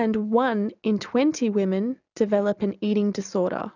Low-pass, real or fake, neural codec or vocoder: 7.2 kHz; real; none